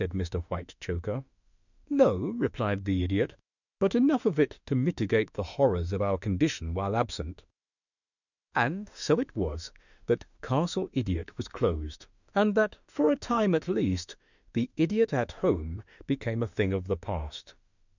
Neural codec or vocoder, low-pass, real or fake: autoencoder, 48 kHz, 32 numbers a frame, DAC-VAE, trained on Japanese speech; 7.2 kHz; fake